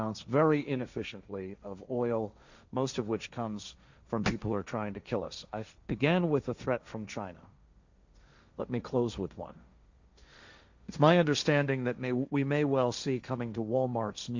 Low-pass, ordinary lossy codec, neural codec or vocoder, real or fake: 7.2 kHz; Opus, 64 kbps; codec, 16 kHz, 1.1 kbps, Voila-Tokenizer; fake